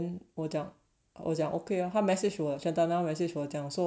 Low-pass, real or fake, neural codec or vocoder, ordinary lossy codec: none; real; none; none